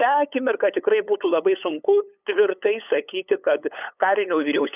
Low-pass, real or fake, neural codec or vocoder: 3.6 kHz; fake; codec, 16 kHz, 8 kbps, FunCodec, trained on LibriTTS, 25 frames a second